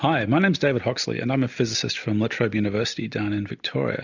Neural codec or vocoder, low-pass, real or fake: none; 7.2 kHz; real